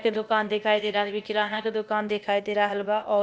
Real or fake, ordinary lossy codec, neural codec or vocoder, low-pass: fake; none; codec, 16 kHz, 0.8 kbps, ZipCodec; none